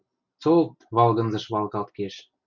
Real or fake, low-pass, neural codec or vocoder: real; 7.2 kHz; none